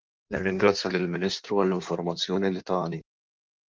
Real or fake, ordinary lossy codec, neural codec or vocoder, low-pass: fake; Opus, 24 kbps; codec, 16 kHz in and 24 kHz out, 1.1 kbps, FireRedTTS-2 codec; 7.2 kHz